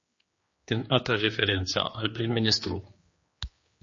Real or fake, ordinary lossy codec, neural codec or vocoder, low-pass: fake; MP3, 32 kbps; codec, 16 kHz, 2 kbps, X-Codec, HuBERT features, trained on general audio; 7.2 kHz